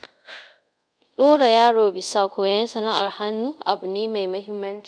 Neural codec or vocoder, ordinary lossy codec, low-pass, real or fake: codec, 24 kHz, 0.5 kbps, DualCodec; none; 9.9 kHz; fake